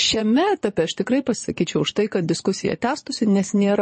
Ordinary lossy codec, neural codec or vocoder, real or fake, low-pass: MP3, 32 kbps; vocoder, 22.05 kHz, 80 mel bands, Vocos; fake; 9.9 kHz